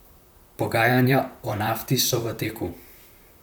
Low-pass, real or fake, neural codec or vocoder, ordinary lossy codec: none; fake; vocoder, 44.1 kHz, 128 mel bands, Pupu-Vocoder; none